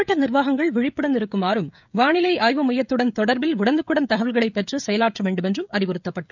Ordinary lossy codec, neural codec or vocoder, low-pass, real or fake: none; codec, 16 kHz, 16 kbps, FreqCodec, smaller model; 7.2 kHz; fake